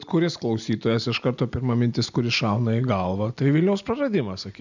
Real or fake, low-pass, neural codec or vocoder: real; 7.2 kHz; none